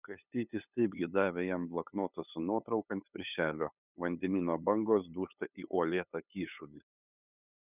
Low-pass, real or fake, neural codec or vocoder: 3.6 kHz; fake; codec, 16 kHz, 8 kbps, FunCodec, trained on LibriTTS, 25 frames a second